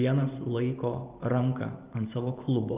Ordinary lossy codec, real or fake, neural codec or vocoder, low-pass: Opus, 24 kbps; fake; vocoder, 44.1 kHz, 128 mel bands every 512 samples, BigVGAN v2; 3.6 kHz